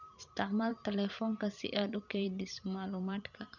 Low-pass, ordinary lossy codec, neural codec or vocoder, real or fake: 7.2 kHz; none; vocoder, 44.1 kHz, 128 mel bands every 512 samples, BigVGAN v2; fake